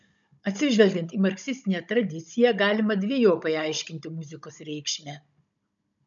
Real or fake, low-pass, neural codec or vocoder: fake; 7.2 kHz; codec, 16 kHz, 16 kbps, FunCodec, trained on Chinese and English, 50 frames a second